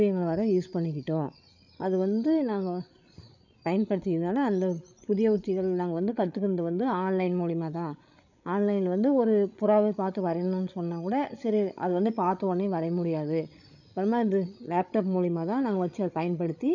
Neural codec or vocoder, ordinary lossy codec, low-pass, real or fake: codec, 16 kHz, 16 kbps, FreqCodec, larger model; none; 7.2 kHz; fake